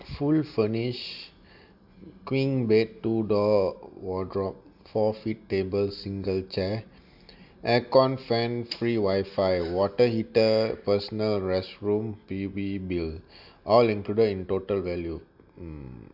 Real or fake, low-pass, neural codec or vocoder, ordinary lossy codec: real; 5.4 kHz; none; AAC, 48 kbps